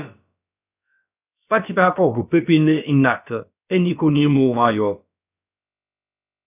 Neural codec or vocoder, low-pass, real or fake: codec, 16 kHz, about 1 kbps, DyCAST, with the encoder's durations; 3.6 kHz; fake